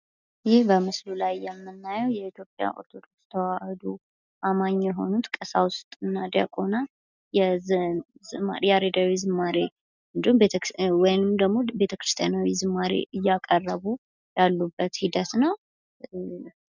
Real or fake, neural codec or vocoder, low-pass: real; none; 7.2 kHz